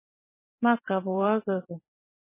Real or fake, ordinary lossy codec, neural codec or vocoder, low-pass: fake; MP3, 16 kbps; vocoder, 44.1 kHz, 128 mel bands every 512 samples, BigVGAN v2; 3.6 kHz